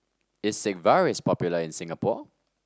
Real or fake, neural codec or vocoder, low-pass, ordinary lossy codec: real; none; none; none